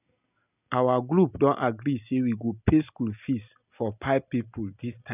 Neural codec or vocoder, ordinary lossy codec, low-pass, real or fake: none; none; 3.6 kHz; real